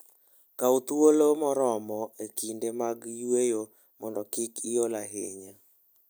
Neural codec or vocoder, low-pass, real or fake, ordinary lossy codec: none; none; real; none